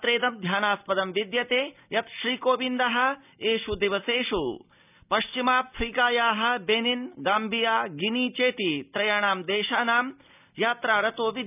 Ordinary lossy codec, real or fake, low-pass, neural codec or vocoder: none; real; 3.6 kHz; none